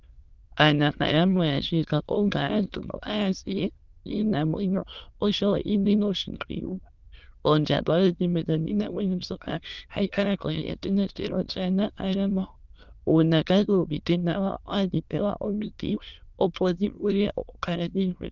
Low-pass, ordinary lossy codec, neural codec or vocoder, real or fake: 7.2 kHz; Opus, 32 kbps; autoencoder, 22.05 kHz, a latent of 192 numbers a frame, VITS, trained on many speakers; fake